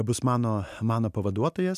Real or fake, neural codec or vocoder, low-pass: real; none; 14.4 kHz